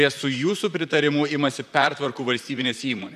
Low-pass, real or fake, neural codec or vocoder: 14.4 kHz; fake; vocoder, 44.1 kHz, 128 mel bands, Pupu-Vocoder